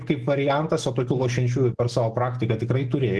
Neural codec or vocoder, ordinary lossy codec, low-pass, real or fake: none; Opus, 16 kbps; 10.8 kHz; real